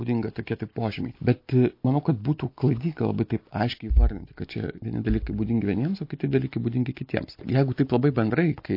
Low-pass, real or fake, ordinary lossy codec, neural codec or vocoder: 5.4 kHz; real; MP3, 32 kbps; none